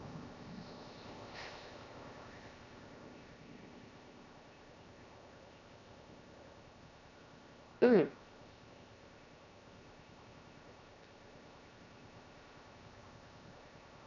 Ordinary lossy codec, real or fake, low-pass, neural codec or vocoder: none; fake; 7.2 kHz; codec, 16 kHz, 0.7 kbps, FocalCodec